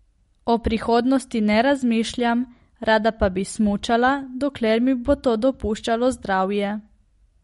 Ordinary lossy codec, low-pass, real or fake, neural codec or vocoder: MP3, 48 kbps; 19.8 kHz; real; none